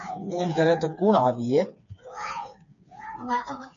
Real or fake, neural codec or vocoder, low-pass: fake; codec, 16 kHz, 4 kbps, FreqCodec, smaller model; 7.2 kHz